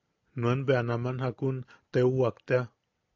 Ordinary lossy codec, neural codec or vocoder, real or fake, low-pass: AAC, 48 kbps; none; real; 7.2 kHz